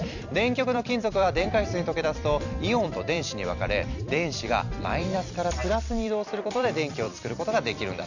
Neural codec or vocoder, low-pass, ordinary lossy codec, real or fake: vocoder, 44.1 kHz, 128 mel bands every 256 samples, BigVGAN v2; 7.2 kHz; none; fake